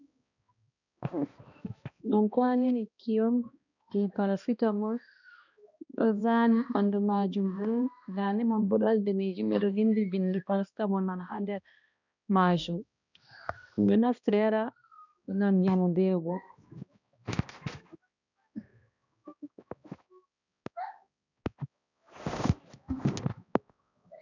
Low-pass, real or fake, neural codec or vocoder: 7.2 kHz; fake; codec, 16 kHz, 1 kbps, X-Codec, HuBERT features, trained on balanced general audio